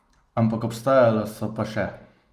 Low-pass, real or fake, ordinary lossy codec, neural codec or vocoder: 14.4 kHz; real; Opus, 32 kbps; none